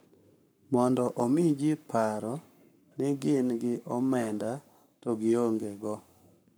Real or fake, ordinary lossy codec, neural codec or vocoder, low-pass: fake; none; codec, 44.1 kHz, 7.8 kbps, Pupu-Codec; none